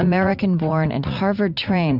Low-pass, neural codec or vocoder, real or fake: 5.4 kHz; vocoder, 44.1 kHz, 80 mel bands, Vocos; fake